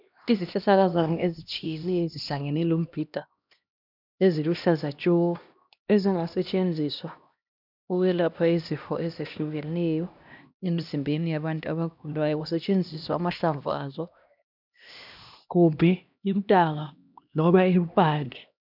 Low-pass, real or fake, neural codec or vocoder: 5.4 kHz; fake; codec, 16 kHz, 1 kbps, X-Codec, HuBERT features, trained on LibriSpeech